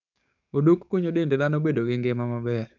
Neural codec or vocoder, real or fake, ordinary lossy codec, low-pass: codec, 44.1 kHz, 7.8 kbps, DAC; fake; none; 7.2 kHz